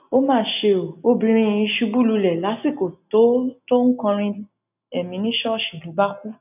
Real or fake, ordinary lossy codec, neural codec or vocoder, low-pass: real; none; none; 3.6 kHz